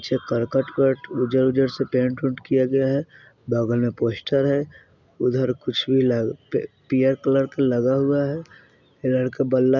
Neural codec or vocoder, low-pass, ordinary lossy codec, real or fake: none; 7.2 kHz; none; real